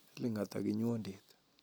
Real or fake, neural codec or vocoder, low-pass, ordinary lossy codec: real; none; none; none